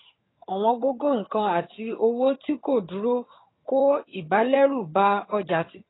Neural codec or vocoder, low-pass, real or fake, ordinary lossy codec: vocoder, 22.05 kHz, 80 mel bands, HiFi-GAN; 7.2 kHz; fake; AAC, 16 kbps